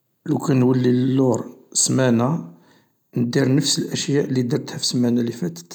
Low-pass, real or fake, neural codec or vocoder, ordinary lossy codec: none; real; none; none